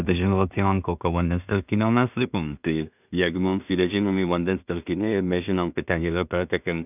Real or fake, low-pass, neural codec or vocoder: fake; 3.6 kHz; codec, 16 kHz in and 24 kHz out, 0.4 kbps, LongCat-Audio-Codec, two codebook decoder